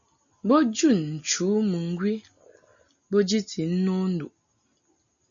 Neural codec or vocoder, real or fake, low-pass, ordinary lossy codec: none; real; 7.2 kHz; MP3, 96 kbps